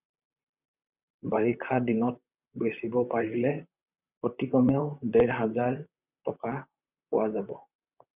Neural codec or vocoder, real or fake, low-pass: vocoder, 44.1 kHz, 128 mel bands, Pupu-Vocoder; fake; 3.6 kHz